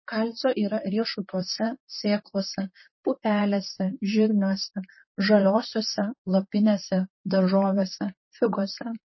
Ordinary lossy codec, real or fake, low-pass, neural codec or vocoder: MP3, 24 kbps; fake; 7.2 kHz; vocoder, 44.1 kHz, 128 mel bands, Pupu-Vocoder